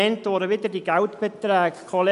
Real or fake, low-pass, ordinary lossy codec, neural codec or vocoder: real; 10.8 kHz; AAC, 96 kbps; none